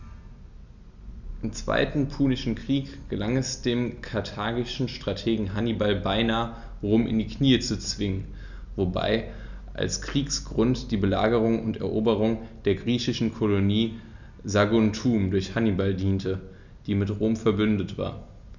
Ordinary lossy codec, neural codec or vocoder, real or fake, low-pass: none; none; real; 7.2 kHz